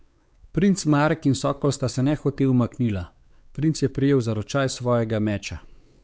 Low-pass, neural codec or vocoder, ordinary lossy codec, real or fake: none; codec, 16 kHz, 4 kbps, X-Codec, WavLM features, trained on Multilingual LibriSpeech; none; fake